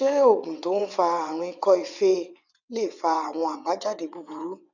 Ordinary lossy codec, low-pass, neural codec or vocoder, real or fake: none; 7.2 kHz; vocoder, 22.05 kHz, 80 mel bands, WaveNeXt; fake